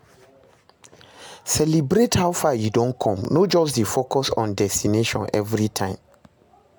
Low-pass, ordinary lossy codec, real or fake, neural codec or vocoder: none; none; real; none